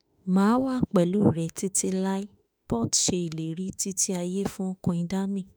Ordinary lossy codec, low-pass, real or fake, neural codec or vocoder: none; none; fake; autoencoder, 48 kHz, 32 numbers a frame, DAC-VAE, trained on Japanese speech